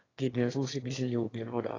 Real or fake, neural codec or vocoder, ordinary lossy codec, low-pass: fake; autoencoder, 22.05 kHz, a latent of 192 numbers a frame, VITS, trained on one speaker; AAC, 32 kbps; 7.2 kHz